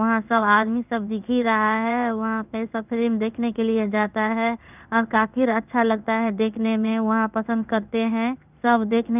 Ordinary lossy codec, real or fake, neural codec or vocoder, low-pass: none; fake; codec, 16 kHz in and 24 kHz out, 1 kbps, XY-Tokenizer; 3.6 kHz